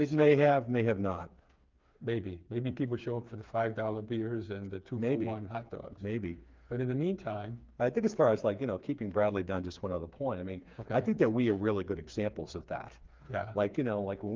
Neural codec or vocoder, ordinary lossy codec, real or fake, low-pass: codec, 16 kHz, 4 kbps, FreqCodec, smaller model; Opus, 24 kbps; fake; 7.2 kHz